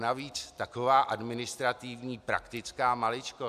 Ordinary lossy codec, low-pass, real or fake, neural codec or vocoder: AAC, 96 kbps; 14.4 kHz; real; none